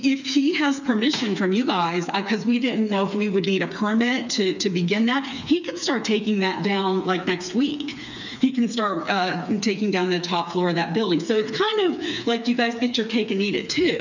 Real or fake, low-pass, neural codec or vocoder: fake; 7.2 kHz; codec, 16 kHz, 4 kbps, FreqCodec, smaller model